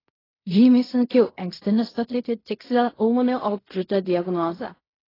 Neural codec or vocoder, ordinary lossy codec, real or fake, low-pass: codec, 16 kHz in and 24 kHz out, 0.4 kbps, LongCat-Audio-Codec, fine tuned four codebook decoder; AAC, 24 kbps; fake; 5.4 kHz